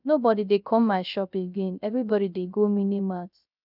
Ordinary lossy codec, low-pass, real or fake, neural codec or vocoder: none; 5.4 kHz; fake; codec, 16 kHz, 0.3 kbps, FocalCodec